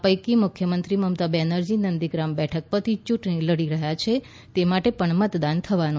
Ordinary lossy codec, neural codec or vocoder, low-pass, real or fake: none; none; none; real